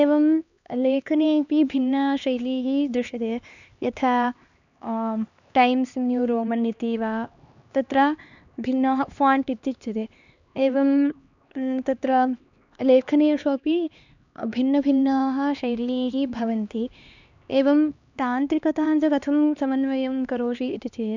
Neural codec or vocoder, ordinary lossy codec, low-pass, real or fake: codec, 16 kHz, 2 kbps, X-Codec, HuBERT features, trained on LibriSpeech; none; 7.2 kHz; fake